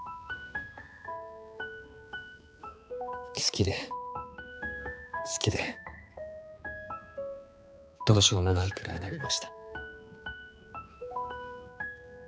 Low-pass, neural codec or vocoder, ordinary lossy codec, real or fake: none; codec, 16 kHz, 4 kbps, X-Codec, HuBERT features, trained on balanced general audio; none; fake